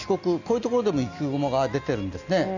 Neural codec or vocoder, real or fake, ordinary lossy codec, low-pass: none; real; none; 7.2 kHz